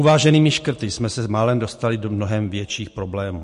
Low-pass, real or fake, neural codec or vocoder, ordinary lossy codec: 10.8 kHz; real; none; MP3, 48 kbps